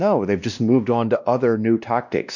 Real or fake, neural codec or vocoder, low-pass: fake; codec, 16 kHz, 1 kbps, X-Codec, WavLM features, trained on Multilingual LibriSpeech; 7.2 kHz